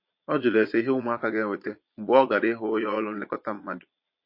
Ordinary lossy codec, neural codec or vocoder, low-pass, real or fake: MP3, 32 kbps; vocoder, 22.05 kHz, 80 mel bands, Vocos; 5.4 kHz; fake